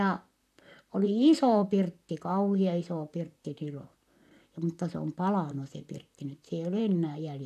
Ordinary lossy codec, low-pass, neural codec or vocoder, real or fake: none; 14.4 kHz; vocoder, 44.1 kHz, 128 mel bands, Pupu-Vocoder; fake